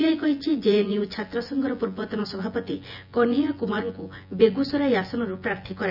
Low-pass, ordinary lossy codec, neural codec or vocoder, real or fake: 5.4 kHz; none; vocoder, 24 kHz, 100 mel bands, Vocos; fake